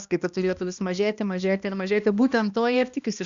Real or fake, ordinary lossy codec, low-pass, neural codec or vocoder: fake; Opus, 64 kbps; 7.2 kHz; codec, 16 kHz, 1 kbps, X-Codec, HuBERT features, trained on balanced general audio